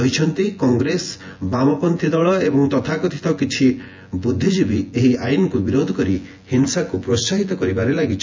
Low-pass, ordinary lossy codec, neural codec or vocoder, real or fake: 7.2 kHz; MP3, 64 kbps; vocoder, 24 kHz, 100 mel bands, Vocos; fake